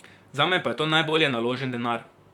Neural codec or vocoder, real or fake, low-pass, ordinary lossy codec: vocoder, 44.1 kHz, 128 mel bands, Pupu-Vocoder; fake; 19.8 kHz; none